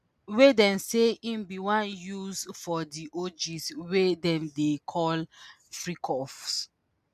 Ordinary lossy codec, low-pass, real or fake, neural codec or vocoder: none; 14.4 kHz; real; none